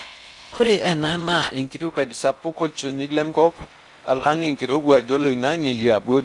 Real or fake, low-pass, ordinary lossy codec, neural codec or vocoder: fake; 10.8 kHz; none; codec, 16 kHz in and 24 kHz out, 0.6 kbps, FocalCodec, streaming, 4096 codes